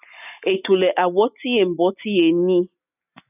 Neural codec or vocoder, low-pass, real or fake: none; 3.6 kHz; real